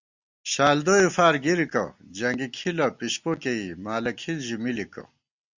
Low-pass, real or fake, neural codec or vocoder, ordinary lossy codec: 7.2 kHz; real; none; Opus, 64 kbps